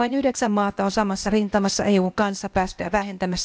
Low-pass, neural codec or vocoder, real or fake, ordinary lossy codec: none; codec, 16 kHz, 0.8 kbps, ZipCodec; fake; none